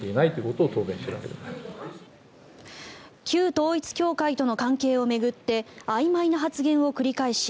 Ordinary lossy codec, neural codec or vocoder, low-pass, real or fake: none; none; none; real